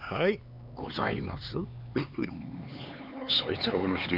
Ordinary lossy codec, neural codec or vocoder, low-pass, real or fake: none; codec, 16 kHz, 4 kbps, X-Codec, HuBERT features, trained on LibriSpeech; 5.4 kHz; fake